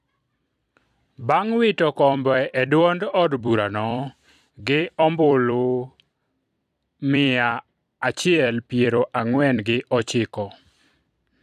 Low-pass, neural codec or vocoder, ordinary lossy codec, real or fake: 14.4 kHz; vocoder, 44.1 kHz, 128 mel bands every 256 samples, BigVGAN v2; none; fake